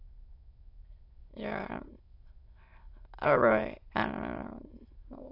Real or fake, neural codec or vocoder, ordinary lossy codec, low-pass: fake; autoencoder, 22.05 kHz, a latent of 192 numbers a frame, VITS, trained on many speakers; AAC, 32 kbps; 5.4 kHz